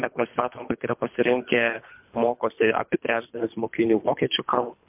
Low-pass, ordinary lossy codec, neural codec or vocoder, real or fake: 3.6 kHz; MP3, 32 kbps; codec, 24 kHz, 3 kbps, HILCodec; fake